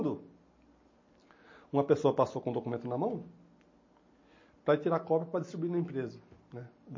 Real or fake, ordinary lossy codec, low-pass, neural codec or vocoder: real; MP3, 32 kbps; 7.2 kHz; none